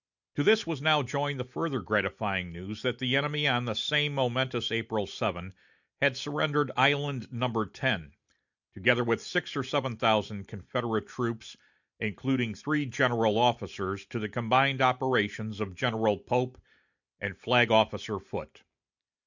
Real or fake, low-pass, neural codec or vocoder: real; 7.2 kHz; none